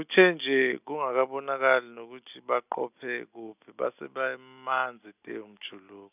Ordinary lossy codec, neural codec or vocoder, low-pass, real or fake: none; none; 3.6 kHz; real